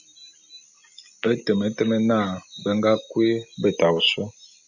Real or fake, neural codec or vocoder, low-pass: real; none; 7.2 kHz